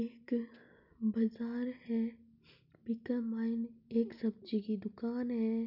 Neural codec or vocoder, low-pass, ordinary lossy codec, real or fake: none; 5.4 kHz; none; real